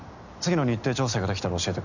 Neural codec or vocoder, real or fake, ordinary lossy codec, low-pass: none; real; none; 7.2 kHz